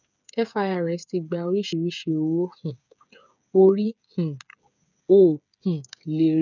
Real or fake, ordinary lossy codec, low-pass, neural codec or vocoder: fake; none; 7.2 kHz; codec, 16 kHz, 8 kbps, FreqCodec, smaller model